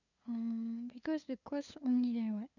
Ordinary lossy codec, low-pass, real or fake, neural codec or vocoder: none; 7.2 kHz; fake; codec, 16 kHz, 2 kbps, FunCodec, trained on LibriTTS, 25 frames a second